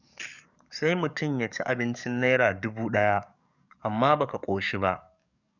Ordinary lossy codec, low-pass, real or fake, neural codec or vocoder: none; 7.2 kHz; fake; codec, 44.1 kHz, 7.8 kbps, DAC